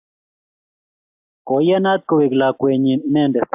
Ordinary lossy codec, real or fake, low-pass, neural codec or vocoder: AAC, 32 kbps; real; 3.6 kHz; none